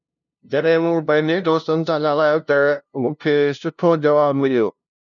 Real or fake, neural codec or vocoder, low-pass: fake; codec, 16 kHz, 0.5 kbps, FunCodec, trained on LibriTTS, 25 frames a second; 7.2 kHz